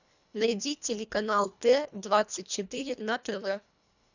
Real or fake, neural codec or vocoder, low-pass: fake; codec, 24 kHz, 1.5 kbps, HILCodec; 7.2 kHz